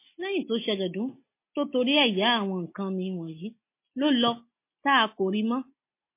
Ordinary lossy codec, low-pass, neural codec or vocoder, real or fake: MP3, 24 kbps; 3.6 kHz; none; real